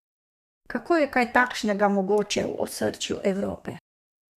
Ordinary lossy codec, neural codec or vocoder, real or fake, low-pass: none; codec, 32 kHz, 1.9 kbps, SNAC; fake; 14.4 kHz